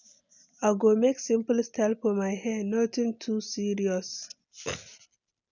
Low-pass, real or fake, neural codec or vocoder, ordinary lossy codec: 7.2 kHz; real; none; none